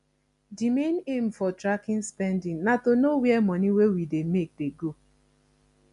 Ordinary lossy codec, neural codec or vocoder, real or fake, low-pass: none; none; real; 10.8 kHz